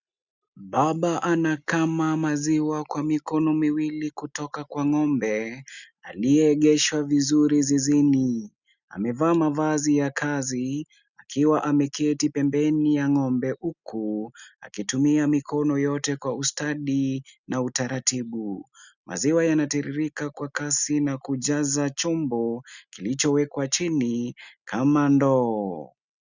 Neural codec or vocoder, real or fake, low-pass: none; real; 7.2 kHz